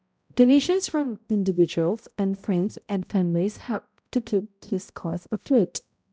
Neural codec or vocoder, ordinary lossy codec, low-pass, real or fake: codec, 16 kHz, 0.5 kbps, X-Codec, HuBERT features, trained on balanced general audio; none; none; fake